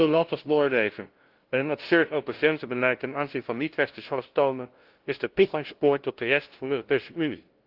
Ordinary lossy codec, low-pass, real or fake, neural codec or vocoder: Opus, 16 kbps; 5.4 kHz; fake; codec, 16 kHz, 0.5 kbps, FunCodec, trained on LibriTTS, 25 frames a second